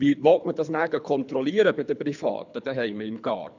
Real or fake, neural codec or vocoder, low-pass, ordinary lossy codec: fake; codec, 24 kHz, 3 kbps, HILCodec; 7.2 kHz; none